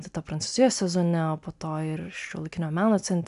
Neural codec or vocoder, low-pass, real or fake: none; 10.8 kHz; real